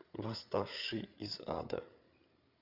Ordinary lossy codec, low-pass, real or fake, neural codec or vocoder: Opus, 64 kbps; 5.4 kHz; fake; codec, 16 kHz, 8 kbps, FreqCodec, larger model